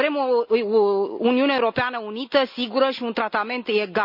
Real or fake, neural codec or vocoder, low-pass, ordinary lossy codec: real; none; 5.4 kHz; none